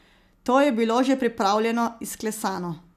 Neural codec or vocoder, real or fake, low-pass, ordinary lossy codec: none; real; 14.4 kHz; none